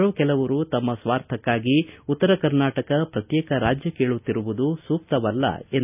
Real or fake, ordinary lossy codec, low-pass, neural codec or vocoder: real; none; 3.6 kHz; none